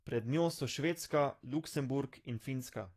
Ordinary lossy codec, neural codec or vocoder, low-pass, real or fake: AAC, 48 kbps; codec, 44.1 kHz, 7.8 kbps, DAC; 14.4 kHz; fake